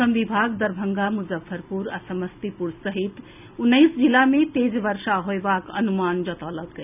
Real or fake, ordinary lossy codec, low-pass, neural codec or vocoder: real; none; 3.6 kHz; none